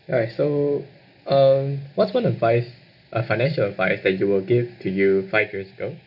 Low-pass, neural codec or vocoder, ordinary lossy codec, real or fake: 5.4 kHz; none; none; real